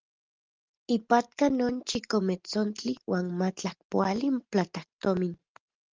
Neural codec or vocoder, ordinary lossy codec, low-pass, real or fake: none; Opus, 32 kbps; 7.2 kHz; real